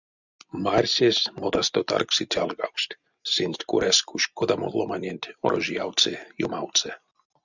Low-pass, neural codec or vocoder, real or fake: 7.2 kHz; none; real